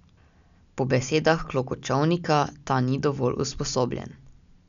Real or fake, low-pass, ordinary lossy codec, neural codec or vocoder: real; 7.2 kHz; none; none